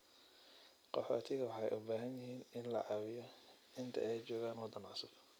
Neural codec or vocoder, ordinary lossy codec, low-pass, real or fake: none; none; none; real